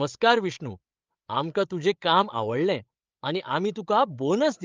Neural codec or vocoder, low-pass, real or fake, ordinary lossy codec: codec, 16 kHz, 8 kbps, FreqCodec, larger model; 7.2 kHz; fake; Opus, 24 kbps